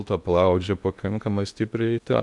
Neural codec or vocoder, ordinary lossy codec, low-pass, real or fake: codec, 16 kHz in and 24 kHz out, 0.8 kbps, FocalCodec, streaming, 65536 codes; MP3, 96 kbps; 10.8 kHz; fake